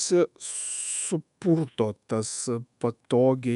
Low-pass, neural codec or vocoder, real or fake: 10.8 kHz; codec, 24 kHz, 1.2 kbps, DualCodec; fake